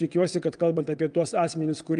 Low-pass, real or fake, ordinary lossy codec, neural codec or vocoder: 9.9 kHz; fake; Opus, 32 kbps; vocoder, 22.05 kHz, 80 mel bands, WaveNeXt